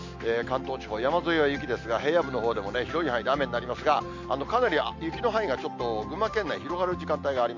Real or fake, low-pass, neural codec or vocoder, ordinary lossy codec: real; 7.2 kHz; none; MP3, 48 kbps